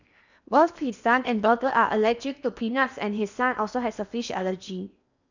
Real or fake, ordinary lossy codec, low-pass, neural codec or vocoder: fake; none; 7.2 kHz; codec, 16 kHz in and 24 kHz out, 0.8 kbps, FocalCodec, streaming, 65536 codes